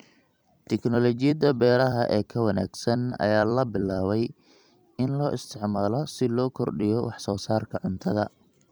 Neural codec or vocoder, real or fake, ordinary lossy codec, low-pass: vocoder, 44.1 kHz, 128 mel bands every 256 samples, BigVGAN v2; fake; none; none